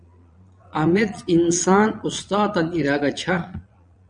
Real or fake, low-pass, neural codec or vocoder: fake; 9.9 kHz; vocoder, 22.05 kHz, 80 mel bands, Vocos